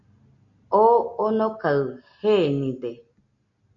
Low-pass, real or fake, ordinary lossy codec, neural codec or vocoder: 7.2 kHz; real; MP3, 96 kbps; none